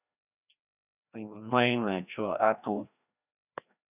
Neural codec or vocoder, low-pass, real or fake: codec, 16 kHz, 1 kbps, FreqCodec, larger model; 3.6 kHz; fake